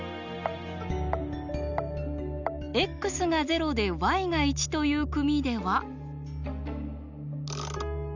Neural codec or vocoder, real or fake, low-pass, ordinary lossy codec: none; real; 7.2 kHz; none